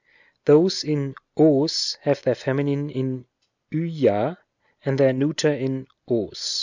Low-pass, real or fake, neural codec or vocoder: 7.2 kHz; real; none